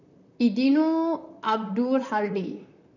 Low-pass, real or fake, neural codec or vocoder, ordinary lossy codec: 7.2 kHz; fake; vocoder, 44.1 kHz, 128 mel bands, Pupu-Vocoder; none